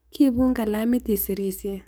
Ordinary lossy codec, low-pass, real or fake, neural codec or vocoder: none; none; fake; codec, 44.1 kHz, 7.8 kbps, DAC